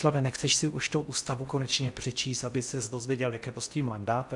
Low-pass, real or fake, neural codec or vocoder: 10.8 kHz; fake; codec, 16 kHz in and 24 kHz out, 0.6 kbps, FocalCodec, streaming, 4096 codes